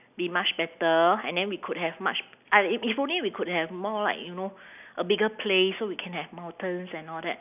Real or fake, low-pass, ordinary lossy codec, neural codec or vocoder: real; 3.6 kHz; none; none